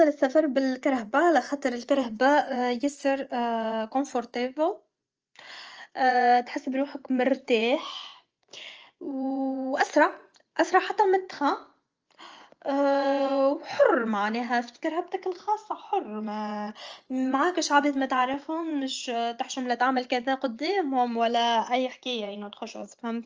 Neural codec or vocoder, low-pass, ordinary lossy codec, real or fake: vocoder, 24 kHz, 100 mel bands, Vocos; 7.2 kHz; Opus, 24 kbps; fake